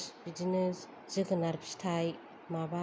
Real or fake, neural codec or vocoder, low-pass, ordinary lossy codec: real; none; none; none